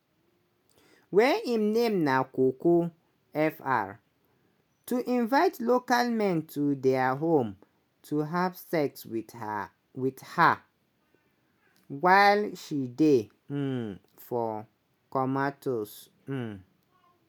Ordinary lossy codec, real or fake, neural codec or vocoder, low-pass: none; real; none; none